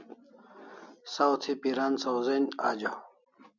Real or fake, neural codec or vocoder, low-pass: real; none; 7.2 kHz